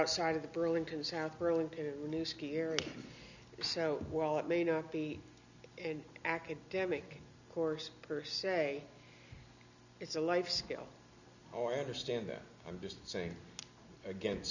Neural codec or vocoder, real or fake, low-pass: none; real; 7.2 kHz